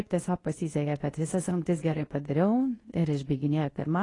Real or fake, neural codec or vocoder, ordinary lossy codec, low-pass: fake; codec, 24 kHz, 0.9 kbps, WavTokenizer, medium speech release version 2; AAC, 32 kbps; 10.8 kHz